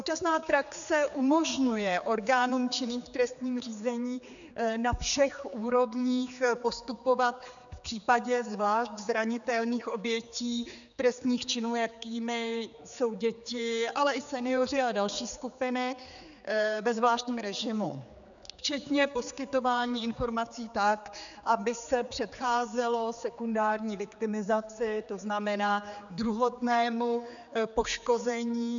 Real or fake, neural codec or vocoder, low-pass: fake; codec, 16 kHz, 4 kbps, X-Codec, HuBERT features, trained on general audio; 7.2 kHz